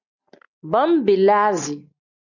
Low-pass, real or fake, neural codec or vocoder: 7.2 kHz; real; none